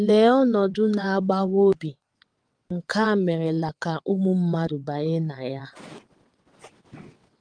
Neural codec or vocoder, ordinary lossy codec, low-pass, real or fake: vocoder, 22.05 kHz, 80 mel bands, WaveNeXt; Opus, 24 kbps; 9.9 kHz; fake